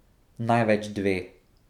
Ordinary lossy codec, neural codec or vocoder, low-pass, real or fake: none; none; 19.8 kHz; real